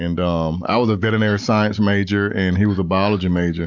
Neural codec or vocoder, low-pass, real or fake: none; 7.2 kHz; real